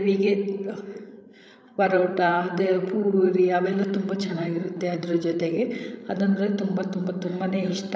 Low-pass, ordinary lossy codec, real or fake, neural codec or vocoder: none; none; fake; codec, 16 kHz, 16 kbps, FreqCodec, larger model